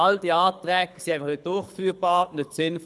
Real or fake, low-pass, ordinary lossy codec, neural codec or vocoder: fake; none; none; codec, 24 kHz, 6 kbps, HILCodec